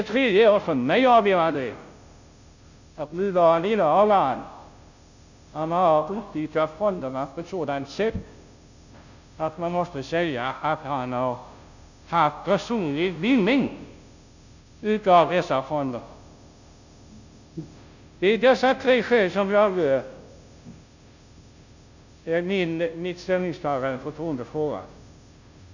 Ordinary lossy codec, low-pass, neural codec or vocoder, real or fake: none; 7.2 kHz; codec, 16 kHz, 0.5 kbps, FunCodec, trained on Chinese and English, 25 frames a second; fake